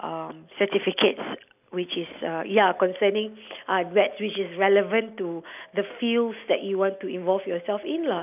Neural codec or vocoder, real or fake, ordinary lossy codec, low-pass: none; real; none; 3.6 kHz